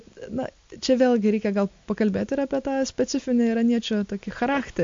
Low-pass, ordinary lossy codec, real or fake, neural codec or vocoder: 7.2 kHz; MP3, 48 kbps; real; none